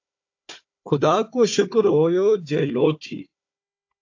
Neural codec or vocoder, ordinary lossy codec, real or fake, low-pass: codec, 16 kHz, 4 kbps, FunCodec, trained on Chinese and English, 50 frames a second; AAC, 48 kbps; fake; 7.2 kHz